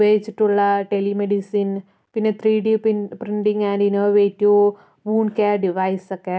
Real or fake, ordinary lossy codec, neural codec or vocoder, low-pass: real; none; none; none